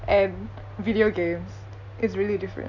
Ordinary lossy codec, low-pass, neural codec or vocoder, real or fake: none; 7.2 kHz; none; real